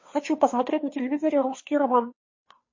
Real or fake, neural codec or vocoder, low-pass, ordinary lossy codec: fake; codec, 16 kHz, 2 kbps, FunCodec, trained on Chinese and English, 25 frames a second; 7.2 kHz; MP3, 32 kbps